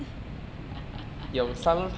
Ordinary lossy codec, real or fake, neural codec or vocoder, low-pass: none; real; none; none